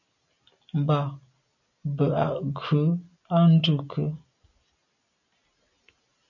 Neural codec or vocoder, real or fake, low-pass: none; real; 7.2 kHz